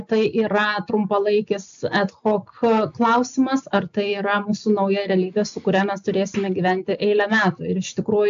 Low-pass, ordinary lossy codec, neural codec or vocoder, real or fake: 7.2 kHz; AAC, 64 kbps; none; real